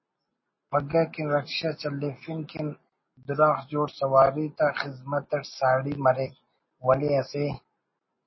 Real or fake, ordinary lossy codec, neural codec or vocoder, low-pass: real; MP3, 24 kbps; none; 7.2 kHz